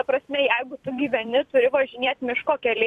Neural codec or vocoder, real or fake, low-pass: vocoder, 44.1 kHz, 128 mel bands every 256 samples, BigVGAN v2; fake; 14.4 kHz